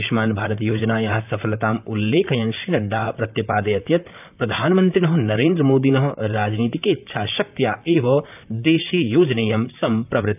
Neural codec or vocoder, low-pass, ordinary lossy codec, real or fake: vocoder, 44.1 kHz, 128 mel bands, Pupu-Vocoder; 3.6 kHz; none; fake